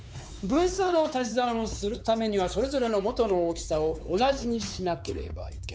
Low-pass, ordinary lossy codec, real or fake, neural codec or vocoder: none; none; fake; codec, 16 kHz, 4 kbps, X-Codec, WavLM features, trained on Multilingual LibriSpeech